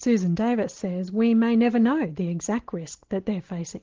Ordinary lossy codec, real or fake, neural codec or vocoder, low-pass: Opus, 16 kbps; real; none; 7.2 kHz